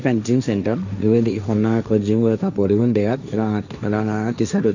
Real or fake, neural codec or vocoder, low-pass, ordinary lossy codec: fake; codec, 16 kHz, 1.1 kbps, Voila-Tokenizer; 7.2 kHz; none